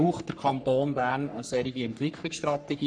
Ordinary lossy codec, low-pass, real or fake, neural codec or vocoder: none; 9.9 kHz; fake; codec, 44.1 kHz, 3.4 kbps, Pupu-Codec